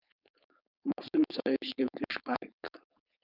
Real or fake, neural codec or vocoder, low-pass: fake; codec, 16 kHz, 4.8 kbps, FACodec; 5.4 kHz